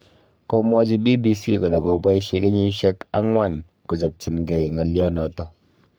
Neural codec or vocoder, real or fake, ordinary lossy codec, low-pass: codec, 44.1 kHz, 3.4 kbps, Pupu-Codec; fake; none; none